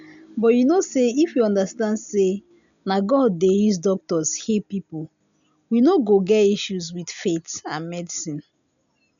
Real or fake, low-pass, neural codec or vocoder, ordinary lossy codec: real; 7.2 kHz; none; none